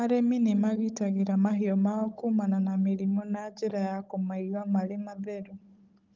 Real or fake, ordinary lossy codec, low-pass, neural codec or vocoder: fake; Opus, 32 kbps; 7.2 kHz; codec, 16 kHz, 16 kbps, FunCodec, trained on Chinese and English, 50 frames a second